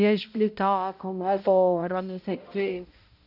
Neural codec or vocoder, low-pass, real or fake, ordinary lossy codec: codec, 16 kHz, 0.5 kbps, X-Codec, HuBERT features, trained on balanced general audio; 5.4 kHz; fake; none